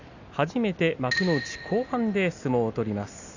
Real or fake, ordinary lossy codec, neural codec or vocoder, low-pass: real; none; none; 7.2 kHz